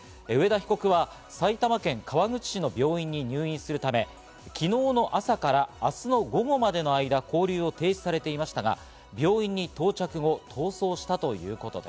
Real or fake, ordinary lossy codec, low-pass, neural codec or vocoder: real; none; none; none